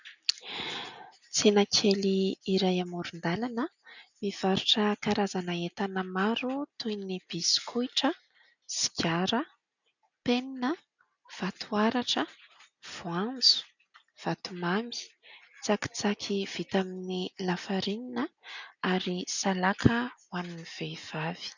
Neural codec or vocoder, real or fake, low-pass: none; real; 7.2 kHz